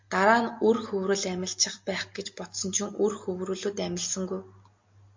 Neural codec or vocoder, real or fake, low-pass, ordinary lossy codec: none; real; 7.2 kHz; MP3, 48 kbps